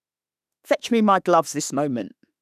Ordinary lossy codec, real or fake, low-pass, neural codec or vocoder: none; fake; 14.4 kHz; autoencoder, 48 kHz, 32 numbers a frame, DAC-VAE, trained on Japanese speech